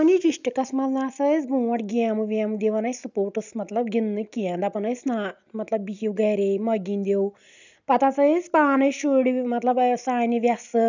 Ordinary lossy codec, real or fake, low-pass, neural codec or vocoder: none; real; 7.2 kHz; none